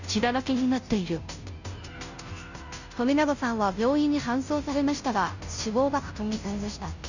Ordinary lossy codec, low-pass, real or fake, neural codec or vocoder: none; 7.2 kHz; fake; codec, 16 kHz, 0.5 kbps, FunCodec, trained on Chinese and English, 25 frames a second